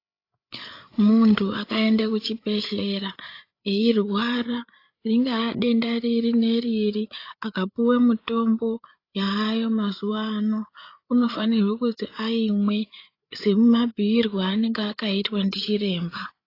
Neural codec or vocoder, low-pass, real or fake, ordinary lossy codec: codec, 16 kHz, 8 kbps, FreqCodec, larger model; 5.4 kHz; fake; AAC, 32 kbps